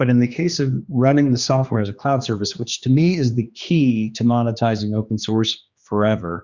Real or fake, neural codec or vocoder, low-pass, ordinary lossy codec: fake; codec, 16 kHz, 2 kbps, X-Codec, HuBERT features, trained on general audio; 7.2 kHz; Opus, 64 kbps